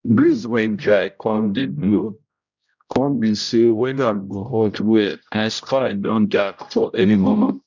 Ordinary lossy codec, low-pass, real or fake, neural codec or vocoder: none; 7.2 kHz; fake; codec, 16 kHz, 0.5 kbps, X-Codec, HuBERT features, trained on general audio